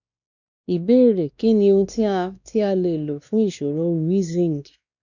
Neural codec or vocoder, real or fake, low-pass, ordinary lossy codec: codec, 16 kHz, 2 kbps, X-Codec, WavLM features, trained on Multilingual LibriSpeech; fake; 7.2 kHz; none